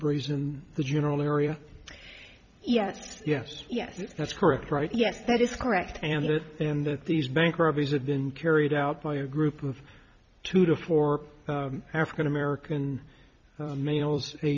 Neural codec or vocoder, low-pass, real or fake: vocoder, 44.1 kHz, 128 mel bands every 256 samples, BigVGAN v2; 7.2 kHz; fake